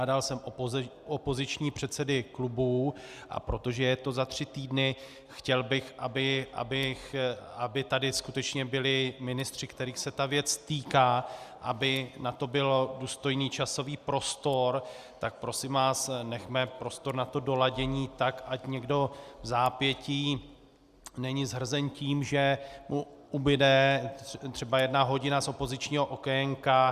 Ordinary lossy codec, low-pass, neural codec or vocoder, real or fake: Opus, 64 kbps; 14.4 kHz; none; real